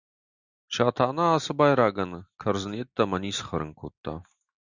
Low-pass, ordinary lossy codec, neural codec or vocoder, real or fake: 7.2 kHz; Opus, 64 kbps; none; real